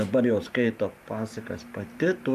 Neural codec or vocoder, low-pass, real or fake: vocoder, 44.1 kHz, 128 mel bands every 512 samples, BigVGAN v2; 14.4 kHz; fake